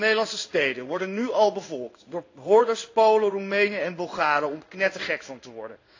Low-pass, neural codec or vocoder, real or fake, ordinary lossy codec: 7.2 kHz; codec, 16 kHz in and 24 kHz out, 1 kbps, XY-Tokenizer; fake; AAC, 48 kbps